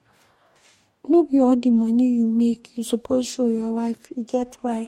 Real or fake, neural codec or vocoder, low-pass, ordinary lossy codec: fake; codec, 44.1 kHz, 2.6 kbps, DAC; 19.8 kHz; MP3, 64 kbps